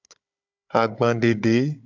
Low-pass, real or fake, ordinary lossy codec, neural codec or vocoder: 7.2 kHz; fake; AAC, 48 kbps; codec, 16 kHz, 16 kbps, FunCodec, trained on Chinese and English, 50 frames a second